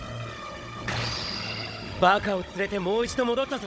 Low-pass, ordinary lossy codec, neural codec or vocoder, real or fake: none; none; codec, 16 kHz, 16 kbps, FunCodec, trained on Chinese and English, 50 frames a second; fake